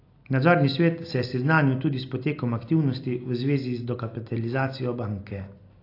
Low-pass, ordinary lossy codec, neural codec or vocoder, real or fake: 5.4 kHz; AAC, 32 kbps; none; real